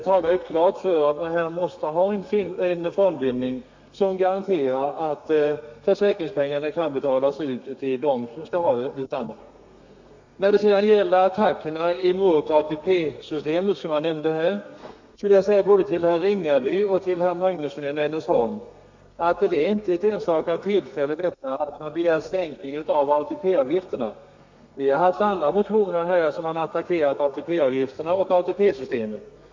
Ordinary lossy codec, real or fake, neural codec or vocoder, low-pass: MP3, 48 kbps; fake; codec, 32 kHz, 1.9 kbps, SNAC; 7.2 kHz